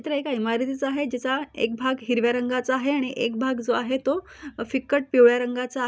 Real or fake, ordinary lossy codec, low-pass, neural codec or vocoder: real; none; none; none